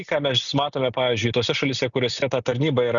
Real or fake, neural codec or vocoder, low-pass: real; none; 7.2 kHz